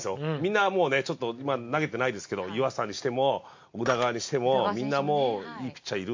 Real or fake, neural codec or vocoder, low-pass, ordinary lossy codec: real; none; 7.2 kHz; MP3, 48 kbps